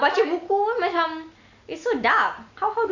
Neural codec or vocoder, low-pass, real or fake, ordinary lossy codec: none; 7.2 kHz; real; Opus, 64 kbps